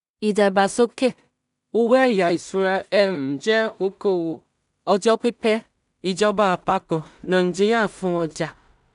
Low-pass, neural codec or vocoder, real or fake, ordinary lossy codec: 10.8 kHz; codec, 16 kHz in and 24 kHz out, 0.4 kbps, LongCat-Audio-Codec, two codebook decoder; fake; none